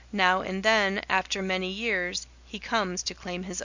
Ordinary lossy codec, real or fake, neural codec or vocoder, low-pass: Opus, 64 kbps; real; none; 7.2 kHz